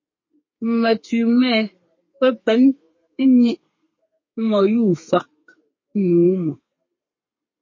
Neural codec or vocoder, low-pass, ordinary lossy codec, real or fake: codec, 32 kHz, 1.9 kbps, SNAC; 7.2 kHz; MP3, 32 kbps; fake